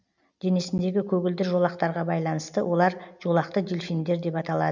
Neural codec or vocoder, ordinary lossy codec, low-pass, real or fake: none; none; 7.2 kHz; real